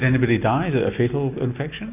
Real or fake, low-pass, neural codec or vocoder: real; 3.6 kHz; none